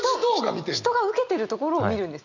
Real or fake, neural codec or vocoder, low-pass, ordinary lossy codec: real; none; 7.2 kHz; none